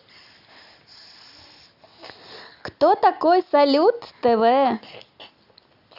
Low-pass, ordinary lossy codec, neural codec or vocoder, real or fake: 5.4 kHz; Opus, 64 kbps; none; real